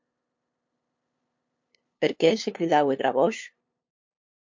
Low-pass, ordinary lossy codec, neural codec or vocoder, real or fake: 7.2 kHz; MP3, 48 kbps; codec, 16 kHz, 2 kbps, FunCodec, trained on LibriTTS, 25 frames a second; fake